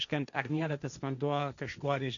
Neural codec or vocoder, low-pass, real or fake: codec, 16 kHz, 1.1 kbps, Voila-Tokenizer; 7.2 kHz; fake